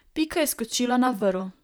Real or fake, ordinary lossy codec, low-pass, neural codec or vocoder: fake; none; none; vocoder, 44.1 kHz, 128 mel bands, Pupu-Vocoder